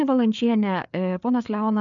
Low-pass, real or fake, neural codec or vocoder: 7.2 kHz; fake; codec, 16 kHz, 4 kbps, FreqCodec, larger model